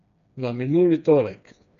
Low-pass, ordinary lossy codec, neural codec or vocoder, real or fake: 7.2 kHz; none; codec, 16 kHz, 2 kbps, FreqCodec, smaller model; fake